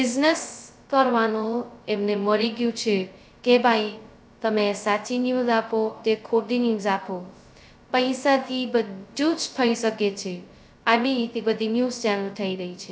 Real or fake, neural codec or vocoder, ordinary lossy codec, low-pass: fake; codec, 16 kHz, 0.2 kbps, FocalCodec; none; none